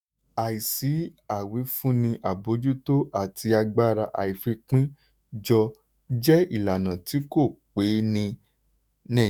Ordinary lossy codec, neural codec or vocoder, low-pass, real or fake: none; autoencoder, 48 kHz, 128 numbers a frame, DAC-VAE, trained on Japanese speech; none; fake